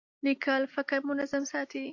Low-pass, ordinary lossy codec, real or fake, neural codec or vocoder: 7.2 kHz; AAC, 48 kbps; real; none